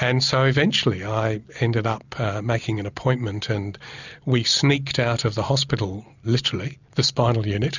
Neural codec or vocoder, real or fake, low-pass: none; real; 7.2 kHz